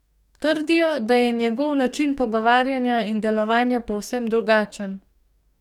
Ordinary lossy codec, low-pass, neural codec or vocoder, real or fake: none; 19.8 kHz; codec, 44.1 kHz, 2.6 kbps, DAC; fake